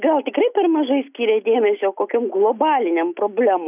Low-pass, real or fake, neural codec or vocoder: 3.6 kHz; real; none